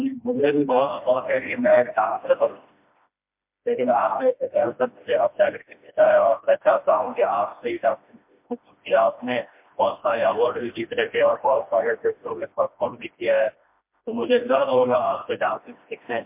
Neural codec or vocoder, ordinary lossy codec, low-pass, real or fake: codec, 16 kHz, 1 kbps, FreqCodec, smaller model; MP3, 32 kbps; 3.6 kHz; fake